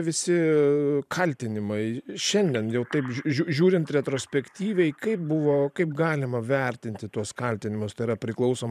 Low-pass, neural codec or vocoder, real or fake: 14.4 kHz; vocoder, 44.1 kHz, 128 mel bands every 512 samples, BigVGAN v2; fake